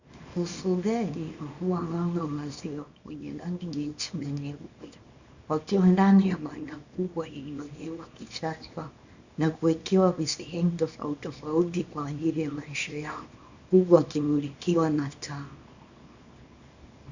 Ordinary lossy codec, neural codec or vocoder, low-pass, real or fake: Opus, 64 kbps; codec, 24 kHz, 0.9 kbps, WavTokenizer, small release; 7.2 kHz; fake